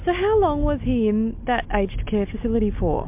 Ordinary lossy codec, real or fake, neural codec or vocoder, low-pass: MP3, 32 kbps; real; none; 3.6 kHz